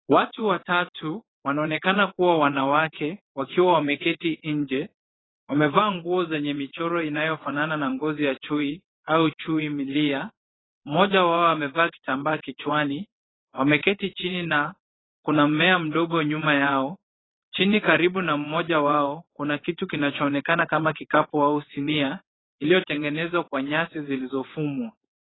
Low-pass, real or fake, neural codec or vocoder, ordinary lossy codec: 7.2 kHz; fake; vocoder, 22.05 kHz, 80 mel bands, WaveNeXt; AAC, 16 kbps